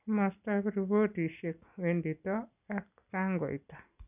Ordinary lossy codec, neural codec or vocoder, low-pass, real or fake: none; none; 3.6 kHz; real